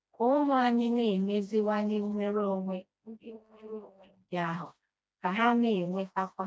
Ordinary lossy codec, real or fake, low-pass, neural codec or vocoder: none; fake; none; codec, 16 kHz, 1 kbps, FreqCodec, smaller model